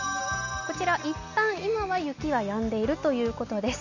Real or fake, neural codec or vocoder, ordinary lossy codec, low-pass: real; none; none; 7.2 kHz